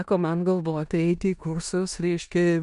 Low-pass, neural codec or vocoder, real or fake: 10.8 kHz; codec, 16 kHz in and 24 kHz out, 0.9 kbps, LongCat-Audio-Codec, four codebook decoder; fake